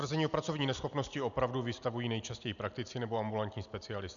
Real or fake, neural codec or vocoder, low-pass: real; none; 7.2 kHz